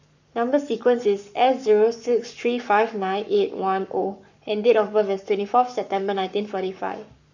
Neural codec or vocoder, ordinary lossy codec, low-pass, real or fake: codec, 44.1 kHz, 7.8 kbps, Pupu-Codec; none; 7.2 kHz; fake